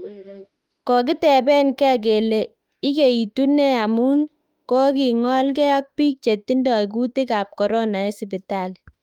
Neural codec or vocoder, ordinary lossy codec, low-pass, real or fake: autoencoder, 48 kHz, 32 numbers a frame, DAC-VAE, trained on Japanese speech; Opus, 32 kbps; 19.8 kHz; fake